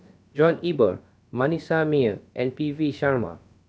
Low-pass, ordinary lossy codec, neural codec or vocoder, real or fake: none; none; codec, 16 kHz, about 1 kbps, DyCAST, with the encoder's durations; fake